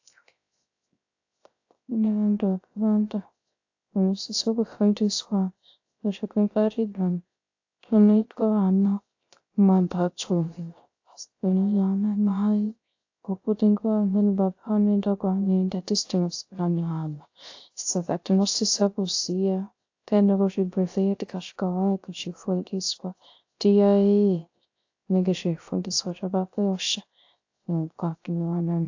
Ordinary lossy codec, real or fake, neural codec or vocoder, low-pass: AAC, 48 kbps; fake; codec, 16 kHz, 0.3 kbps, FocalCodec; 7.2 kHz